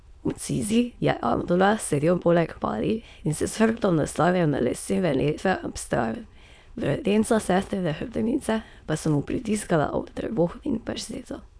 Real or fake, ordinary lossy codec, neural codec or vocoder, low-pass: fake; none; autoencoder, 22.05 kHz, a latent of 192 numbers a frame, VITS, trained on many speakers; none